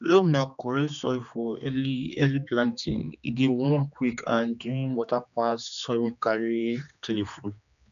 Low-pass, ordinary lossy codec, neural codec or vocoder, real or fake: 7.2 kHz; none; codec, 16 kHz, 2 kbps, X-Codec, HuBERT features, trained on general audio; fake